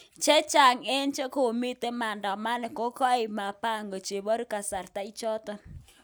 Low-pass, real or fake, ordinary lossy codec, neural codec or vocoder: none; real; none; none